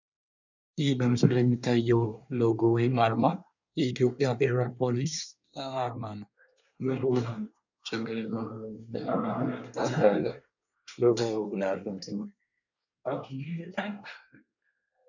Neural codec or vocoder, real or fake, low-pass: codec, 24 kHz, 1 kbps, SNAC; fake; 7.2 kHz